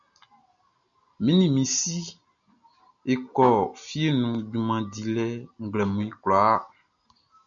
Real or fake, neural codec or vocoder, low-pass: real; none; 7.2 kHz